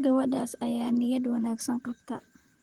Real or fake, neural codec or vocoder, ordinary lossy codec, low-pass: fake; vocoder, 44.1 kHz, 128 mel bands, Pupu-Vocoder; Opus, 24 kbps; 19.8 kHz